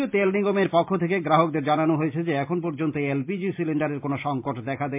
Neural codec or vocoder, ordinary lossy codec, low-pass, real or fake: none; MP3, 32 kbps; 3.6 kHz; real